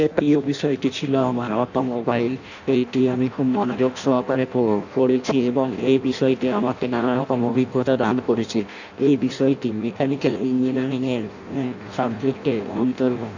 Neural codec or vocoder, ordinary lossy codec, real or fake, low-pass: codec, 16 kHz in and 24 kHz out, 0.6 kbps, FireRedTTS-2 codec; none; fake; 7.2 kHz